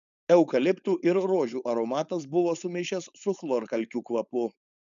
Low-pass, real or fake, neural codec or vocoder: 7.2 kHz; fake; codec, 16 kHz, 4.8 kbps, FACodec